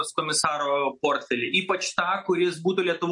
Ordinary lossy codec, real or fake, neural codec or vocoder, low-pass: MP3, 48 kbps; real; none; 10.8 kHz